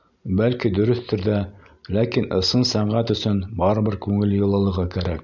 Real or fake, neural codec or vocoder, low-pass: real; none; 7.2 kHz